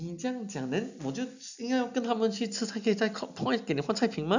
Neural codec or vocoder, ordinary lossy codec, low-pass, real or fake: none; none; 7.2 kHz; real